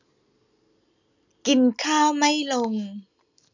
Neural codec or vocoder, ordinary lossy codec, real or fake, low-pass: none; none; real; 7.2 kHz